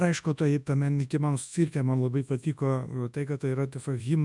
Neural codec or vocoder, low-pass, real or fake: codec, 24 kHz, 0.9 kbps, WavTokenizer, large speech release; 10.8 kHz; fake